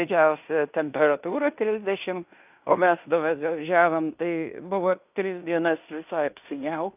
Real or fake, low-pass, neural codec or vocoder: fake; 3.6 kHz; codec, 16 kHz in and 24 kHz out, 0.9 kbps, LongCat-Audio-Codec, fine tuned four codebook decoder